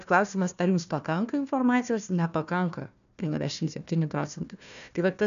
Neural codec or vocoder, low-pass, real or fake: codec, 16 kHz, 1 kbps, FunCodec, trained on Chinese and English, 50 frames a second; 7.2 kHz; fake